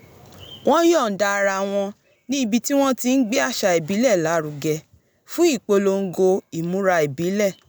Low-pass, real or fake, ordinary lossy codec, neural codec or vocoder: none; real; none; none